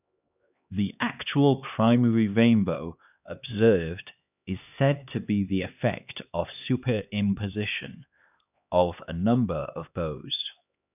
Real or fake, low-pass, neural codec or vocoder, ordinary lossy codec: fake; 3.6 kHz; codec, 16 kHz, 2 kbps, X-Codec, HuBERT features, trained on LibriSpeech; none